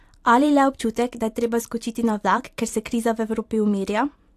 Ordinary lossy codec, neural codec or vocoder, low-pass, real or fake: AAC, 64 kbps; vocoder, 44.1 kHz, 128 mel bands every 256 samples, BigVGAN v2; 14.4 kHz; fake